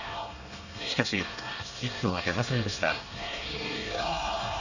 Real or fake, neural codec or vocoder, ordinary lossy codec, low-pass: fake; codec, 24 kHz, 1 kbps, SNAC; none; 7.2 kHz